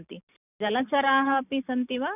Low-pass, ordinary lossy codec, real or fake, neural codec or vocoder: 3.6 kHz; none; real; none